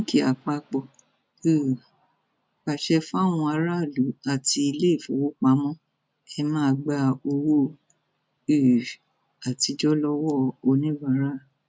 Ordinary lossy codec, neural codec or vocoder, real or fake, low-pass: none; none; real; none